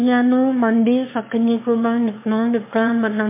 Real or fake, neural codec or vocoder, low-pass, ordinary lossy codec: fake; autoencoder, 22.05 kHz, a latent of 192 numbers a frame, VITS, trained on one speaker; 3.6 kHz; MP3, 24 kbps